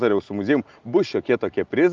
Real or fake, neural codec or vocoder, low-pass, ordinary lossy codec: real; none; 7.2 kHz; Opus, 24 kbps